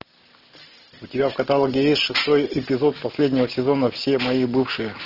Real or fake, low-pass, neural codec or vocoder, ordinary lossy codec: real; 5.4 kHz; none; Opus, 16 kbps